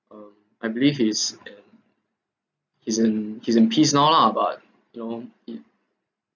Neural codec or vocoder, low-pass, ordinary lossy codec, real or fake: none; 7.2 kHz; none; real